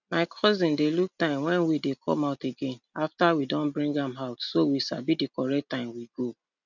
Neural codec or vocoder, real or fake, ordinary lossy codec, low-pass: none; real; none; 7.2 kHz